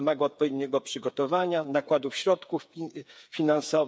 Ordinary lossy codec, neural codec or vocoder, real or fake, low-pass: none; codec, 16 kHz, 8 kbps, FreqCodec, smaller model; fake; none